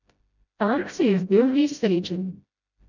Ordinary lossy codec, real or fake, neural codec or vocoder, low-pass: none; fake; codec, 16 kHz, 0.5 kbps, FreqCodec, smaller model; 7.2 kHz